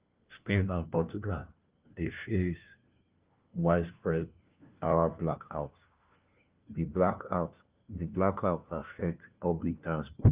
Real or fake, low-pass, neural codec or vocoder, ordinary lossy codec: fake; 3.6 kHz; codec, 16 kHz, 1 kbps, FunCodec, trained on LibriTTS, 50 frames a second; Opus, 32 kbps